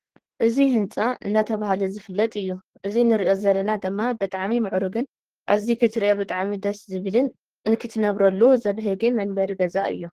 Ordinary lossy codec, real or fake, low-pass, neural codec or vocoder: Opus, 16 kbps; fake; 14.4 kHz; codec, 32 kHz, 1.9 kbps, SNAC